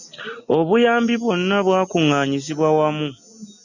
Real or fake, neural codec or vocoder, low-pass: real; none; 7.2 kHz